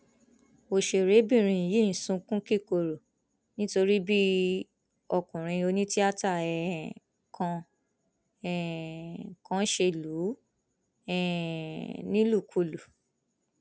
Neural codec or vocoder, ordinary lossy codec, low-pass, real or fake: none; none; none; real